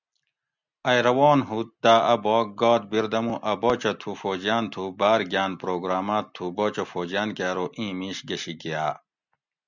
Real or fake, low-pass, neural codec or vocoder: real; 7.2 kHz; none